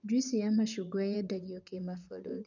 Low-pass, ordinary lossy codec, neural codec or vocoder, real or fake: 7.2 kHz; none; vocoder, 44.1 kHz, 128 mel bands, Pupu-Vocoder; fake